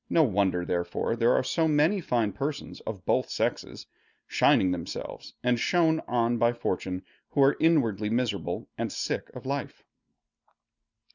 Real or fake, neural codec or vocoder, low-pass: real; none; 7.2 kHz